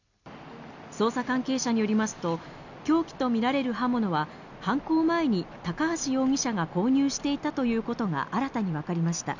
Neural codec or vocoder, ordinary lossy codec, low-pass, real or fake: none; none; 7.2 kHz; real